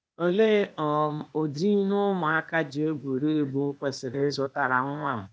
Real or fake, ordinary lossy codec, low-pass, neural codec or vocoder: fake; none; none; codec, 16 kHz, 0.8 kbps, ZipCodec